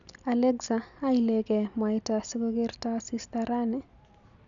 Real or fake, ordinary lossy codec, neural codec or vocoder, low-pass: real; none; none; 7.2 kHz